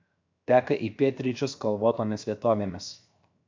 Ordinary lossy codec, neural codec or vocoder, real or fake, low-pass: MP3, 64 kbps; codec, 16 kHz, 0.7 kbps, FocalCodec; fake; 7.2 kHz